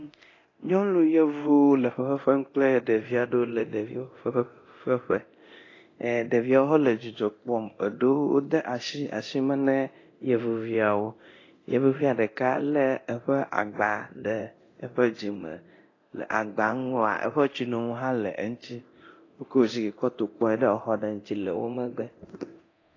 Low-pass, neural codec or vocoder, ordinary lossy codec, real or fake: 7.2 kHz; codec, 24 kHz, 0.9 kbps, DualCodec; AAC, 32 kbps; fake